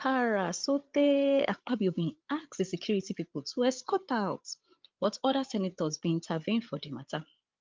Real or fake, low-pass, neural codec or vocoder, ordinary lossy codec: fake; 7.2 kHz; codec, 16 kHz, 8 kbps, FreqCodec, larger model; Opus, 24 kbps